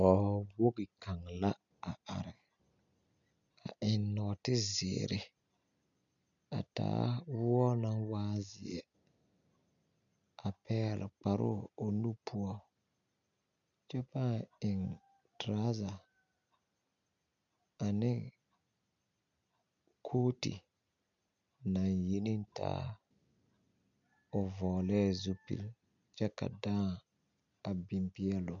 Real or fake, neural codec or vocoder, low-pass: real; none; 7.2 kHz